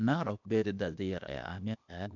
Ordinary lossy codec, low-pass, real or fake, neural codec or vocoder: none; 7.2 kHz; fake; codec, 16 kHz, 0.8 kbps, ZipCodec